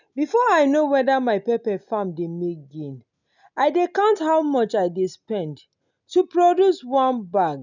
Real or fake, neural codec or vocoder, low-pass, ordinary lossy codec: real; none; 7.2 kHz; none